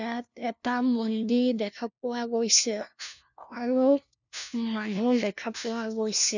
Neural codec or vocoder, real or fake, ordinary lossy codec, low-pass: codec, 16 kHz, 1 kbps, FunCodec, trained on LibriTTS, 50 frames a second; fake; none; 7.2 kHz